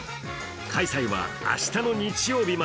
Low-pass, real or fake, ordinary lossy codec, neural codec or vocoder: none; real; none; none